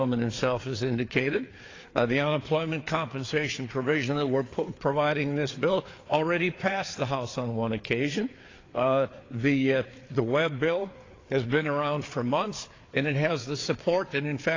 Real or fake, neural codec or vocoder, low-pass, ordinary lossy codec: fake; codec, 16 kHz, 4 kbps, FreqCodec, larger model; 7.2 kHz; AAC, 32 kbps